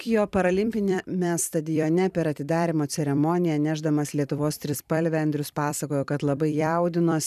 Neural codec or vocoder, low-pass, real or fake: vocoder, 44.1 kHz, 128 mel bands every 256 samples, BigVGAN v2; 14.4 kHz; fake